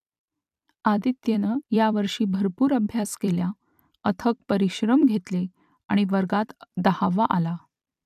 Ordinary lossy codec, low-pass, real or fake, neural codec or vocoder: AAC, 96 kbps; 14.4 kHz; fake; vocoder, 44.1 kHz, 128 mel bands every 256 samples, BigVGAN v2